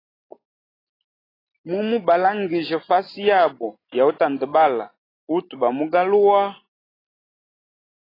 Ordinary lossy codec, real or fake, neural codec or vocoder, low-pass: AAC, 24 kbps; real; none; 5.4 kHz